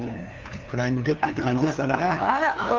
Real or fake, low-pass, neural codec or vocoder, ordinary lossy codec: fake; 7.2 kHz; codec, 16 kHz, 2 kbps, FunCodec, trained on LibriTTS, 25 frames a second; Opus, 32 kbps